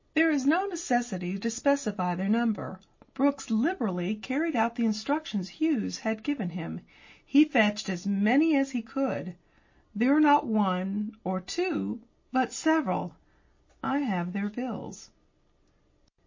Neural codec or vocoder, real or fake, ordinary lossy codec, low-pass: none; real; MP3, 32 kbps; 7.2 kHz